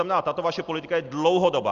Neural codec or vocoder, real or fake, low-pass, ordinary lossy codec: none; real; 7.2 kHz; Opus, 32 kbps